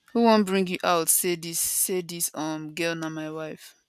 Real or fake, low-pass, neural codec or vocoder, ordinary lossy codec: real; 14.4 kHz; none; none